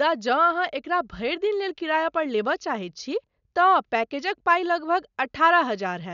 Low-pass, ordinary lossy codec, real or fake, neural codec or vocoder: 7.2 kHz; none; real; none